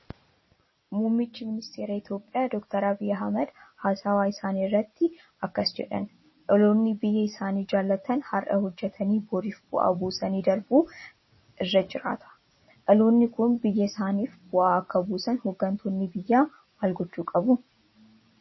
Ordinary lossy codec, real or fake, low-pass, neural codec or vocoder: MP3, 24 kbps; real; 7.2 kHz; none